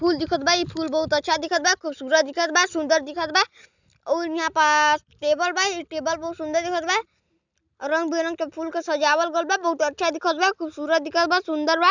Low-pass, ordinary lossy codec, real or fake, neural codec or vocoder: 7.2 kHz; none; real; none